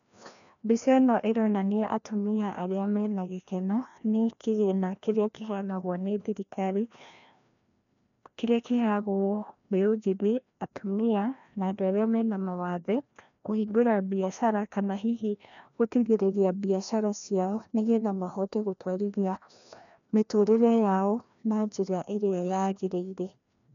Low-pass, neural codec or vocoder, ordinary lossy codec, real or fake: 7.2 kHz; codec, 16 kHz, 1 kbps, FreqCodec, larger model; none; fake